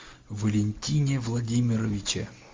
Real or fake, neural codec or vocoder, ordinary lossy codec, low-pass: real; none; Opus, 32 kbps; 7.2 kHz